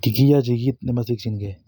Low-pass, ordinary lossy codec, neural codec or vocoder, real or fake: 19.8 kHz; none; none; real